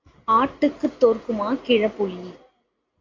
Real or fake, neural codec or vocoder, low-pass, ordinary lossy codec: real; none; 7.2 kHz; AAC, 32 kbps